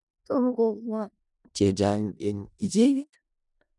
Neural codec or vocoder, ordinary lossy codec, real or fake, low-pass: codec, 16 kHz in and 24 kHz out, 0.4 kbps, LongCat-Audio-Codec, four codebook decoder; none; fake; 10.8 kHz